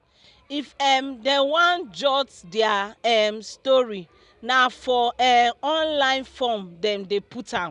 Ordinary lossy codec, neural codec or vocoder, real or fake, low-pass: none; none; real; 10.8 kHz